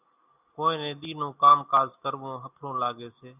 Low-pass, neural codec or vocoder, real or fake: 3.6 kHz; none; real